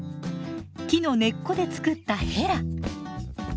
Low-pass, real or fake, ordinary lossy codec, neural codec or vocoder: none; real; none; none